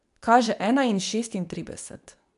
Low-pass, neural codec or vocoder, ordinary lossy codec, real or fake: 10.8 kHz; codec, 24 kHz, 0.9 kbps, WavTokenizer, medium speech release version 2; none; fake